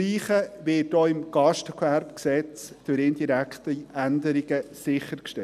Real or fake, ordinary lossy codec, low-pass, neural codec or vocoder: real; none; 14.4 kHz; none